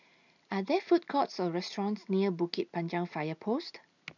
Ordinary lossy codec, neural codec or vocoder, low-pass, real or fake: none; none; 7.2 kHz; real